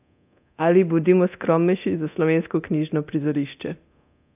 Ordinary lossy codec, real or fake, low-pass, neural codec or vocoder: AAC, 32 kbps; fake; 3.6 kHz; codec, 24 kHz, 0.9 kbps, DualCodec